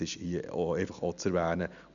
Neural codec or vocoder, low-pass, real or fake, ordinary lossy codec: none; 7.2 kHz; real; none